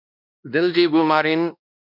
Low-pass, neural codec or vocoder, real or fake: 5.4 kHz; codec, 16 kHz, 1 kbps, X-Codec, WavLM features, trained on Multilingual LibriSpeech; fake